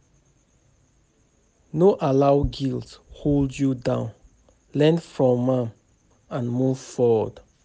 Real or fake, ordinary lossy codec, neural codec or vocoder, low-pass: real; none; none; none